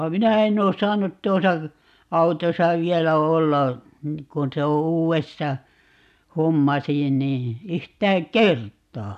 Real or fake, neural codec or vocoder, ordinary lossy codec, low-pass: real; none; none; 14.4 kHz